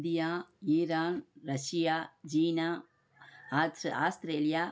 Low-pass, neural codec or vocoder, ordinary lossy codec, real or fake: none; none; none; real